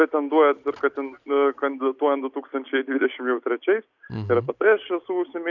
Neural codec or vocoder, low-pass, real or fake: none; 7.2 kHz; real